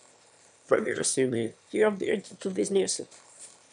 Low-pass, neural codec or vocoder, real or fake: 9.9 kHz; autoencoder, 22.05 kHz, a latent of 192 numbers a frame, VITS, trained on one speaker; fake